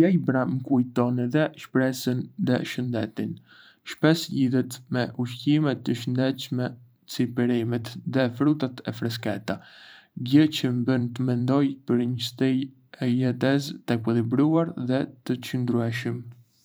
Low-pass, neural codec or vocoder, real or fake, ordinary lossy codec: none; vocoder, 44.1 kHz, 128 mel bands every 512 samples, BigVGAN v2; fake; none